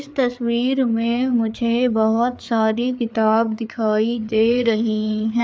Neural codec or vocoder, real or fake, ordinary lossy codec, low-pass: codec, 16 kHz, 4 kbps, FreqCodec, larger model; fake; none; none